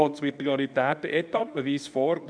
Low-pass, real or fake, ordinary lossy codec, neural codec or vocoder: 9.9 kHz; fake; none; codec, 24 kHz, 0.9 kbps, WavTokenizer, medium speech release version 2